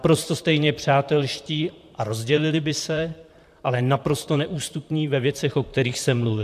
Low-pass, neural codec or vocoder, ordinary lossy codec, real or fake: 14.4 kHz; vocoder, 44.1 kHz, 128 mel bands, Pupu-Vocoder; AAC, 96 kbps; fake